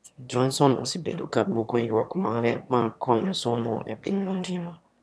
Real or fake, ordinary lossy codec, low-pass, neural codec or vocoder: fake; none; none; autoencoder, 22.05 kHz, a latent of 192 numbers a frame, VITS, trained on one speaker